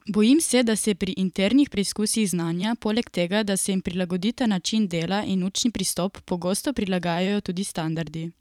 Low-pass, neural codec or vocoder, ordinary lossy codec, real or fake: 19.8 kHz; vocoder, 44.1 kHz, 128 mel bands every 512 samples, BigVGAN v2; none; fake